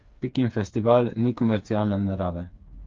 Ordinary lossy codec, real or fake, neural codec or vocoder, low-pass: Opus, 32 kbps; fake; codec, 16 kHz, 4 kbps, FreqCodec, smaller model; 7.2 kHz